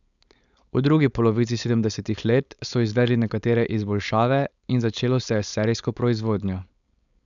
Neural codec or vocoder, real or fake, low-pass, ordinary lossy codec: codec, 16 kHz, 4.8 kbps, FACodec; fake; 7.2 kHz; none